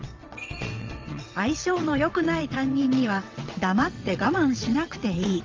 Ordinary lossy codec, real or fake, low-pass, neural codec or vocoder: Opus, 32 kbps; fake; 7.2 kHz; vocoder, 22.05 kHz, 80 mel bands, WaveNeXt